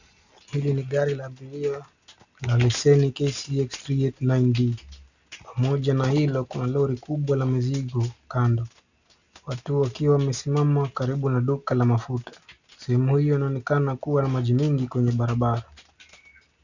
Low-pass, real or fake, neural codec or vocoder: 7.2 kHz; real; none